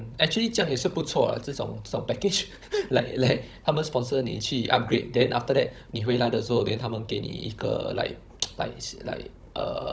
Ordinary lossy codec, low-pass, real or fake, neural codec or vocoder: none; none; fake; codec, 16 kHz, 16 kbps, FunCodec, trained on Chinese and English, 50 frames a second